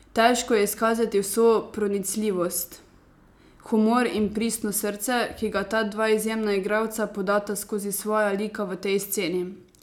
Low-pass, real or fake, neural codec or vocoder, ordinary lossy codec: 19.8 kHz; real; none; none